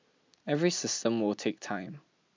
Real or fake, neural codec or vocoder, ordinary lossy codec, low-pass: real; none; MP3, 64 kbps; 7.2 kHz